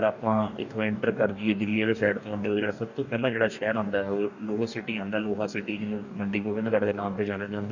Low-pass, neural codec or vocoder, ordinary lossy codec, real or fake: 7.2 kHz; codec, 44.1 kHz, 2.6 kbps, DAC; none; fake